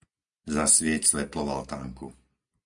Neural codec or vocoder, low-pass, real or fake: none; 10.8 kHz; real